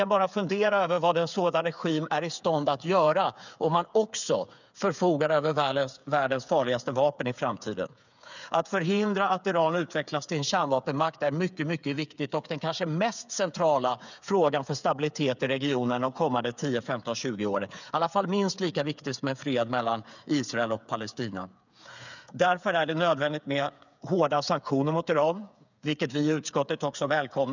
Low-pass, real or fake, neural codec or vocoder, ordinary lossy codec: 7.2 kHz; fake; codec, 16 kHz, 8 kbps, FreqCodec, smaller model; none